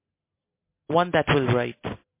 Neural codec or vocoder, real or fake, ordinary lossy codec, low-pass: none; real; MP3, 24 kbps; 3.6 kHz